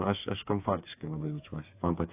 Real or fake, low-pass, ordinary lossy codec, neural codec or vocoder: fake; 3.6 kHz; AAC, 24 kbps; codec, 16 kHz, 4 kbps, FreqCodec, smaller model